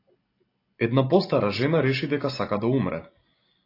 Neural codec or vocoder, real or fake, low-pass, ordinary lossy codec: none; real; 5.4 kHz; AAC, 32 kbps